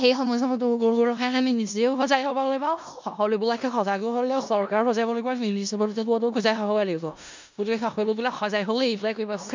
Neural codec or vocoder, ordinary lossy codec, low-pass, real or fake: codec, 16 kHz in and 24 kHz out, 0.4 kbps, LongCat-Audio-Codec, four codebook decoder; MP3, 64 kbps; 7.2 kHz; fake